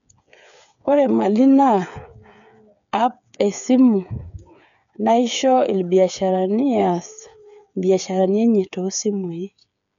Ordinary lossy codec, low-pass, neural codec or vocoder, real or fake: none; 7.2 kHz; codec, 16 kHz, 8 kbps, FreqCodec, smaller model; fake